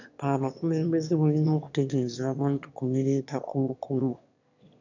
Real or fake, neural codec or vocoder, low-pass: fake; autoencoder, 22.05 kHz, a latent of 192 numbers a frame, VITS, trained on one speaker; 7.2 kHz